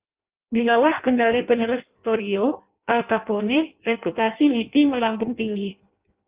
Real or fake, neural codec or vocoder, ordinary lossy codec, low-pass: fake; codec, 16 kHz in and 24 kHz out, 0.6 kbps, FireRedTTS-2 codec; Opus, 24 kbps; 3.6 kHz